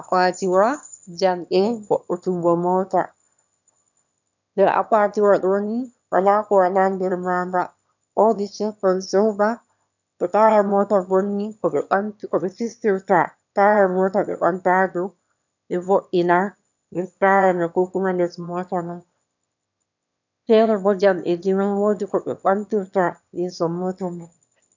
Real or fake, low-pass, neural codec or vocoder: fake; 7.2 kHz; autoencoder, 22.05 kHz, a latent of 192 numbers a frame, VITS, trained on one speaker